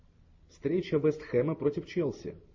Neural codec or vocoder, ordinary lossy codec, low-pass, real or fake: none; MP3, 32 kbps; 7.2 kHz; real